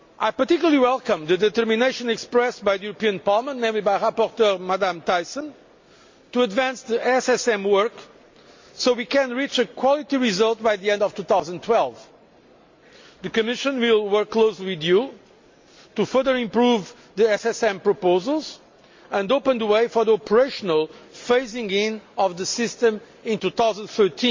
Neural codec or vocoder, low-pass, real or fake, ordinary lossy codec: none; 7.2 kHz; real; MP3, 48 kbps